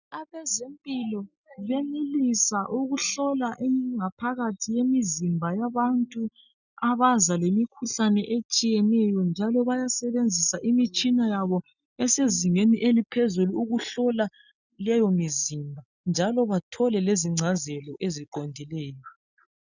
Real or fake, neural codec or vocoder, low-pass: real; none; 7.2 kHz